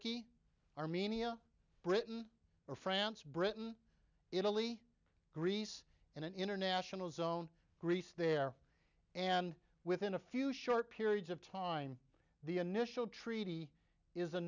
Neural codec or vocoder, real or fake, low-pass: none; real; 7.2 kHz